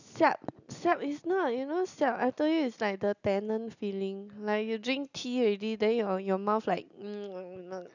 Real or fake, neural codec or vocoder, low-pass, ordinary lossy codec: real; none; 7.2 kHz; none